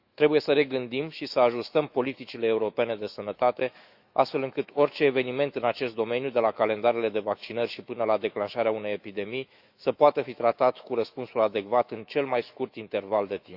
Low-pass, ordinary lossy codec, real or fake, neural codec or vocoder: 5.4 kHz; Opus, 64 kbps; fake; autoencoder, 48 kHz, 128 numbers a frame, DAC-VAE, trained on Japanese speech